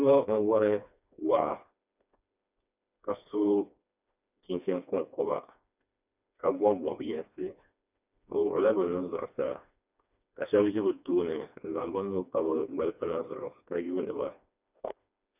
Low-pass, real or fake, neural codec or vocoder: 3.6 kHz; fake; codec, 16 kHz, 2 kbps, FreqCodec, smaller model